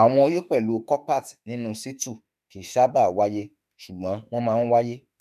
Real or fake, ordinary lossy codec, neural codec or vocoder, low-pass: fake; none; autoencoder, 48 kHz, 32 numbers a frame, DAC-VAE, trained on Japanese speech; 14.4 kHz